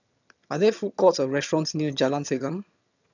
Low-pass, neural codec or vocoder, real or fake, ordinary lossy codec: 7.2 kHz; vocoder, 22.05 kHz, 80 mel bands, HiFi-GAN; fake; none